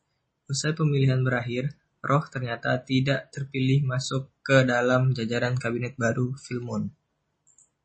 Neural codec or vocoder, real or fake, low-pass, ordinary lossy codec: none; real; 10.8 kHz; MP3, 32 kbps